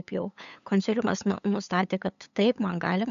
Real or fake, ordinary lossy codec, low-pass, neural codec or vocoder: fake; AAC, 96 kbps; 7.2 kHz; codec, 16 kHz, 8 kbps, FunCodec, trained on LibriTTS, 25 frames a second